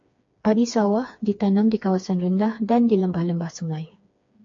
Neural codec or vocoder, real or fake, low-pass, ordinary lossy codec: codec, 16 kHz, 4 kbps, FreqCodec, smaller model; fake; 7.2 kHz; AAC, 48 kbps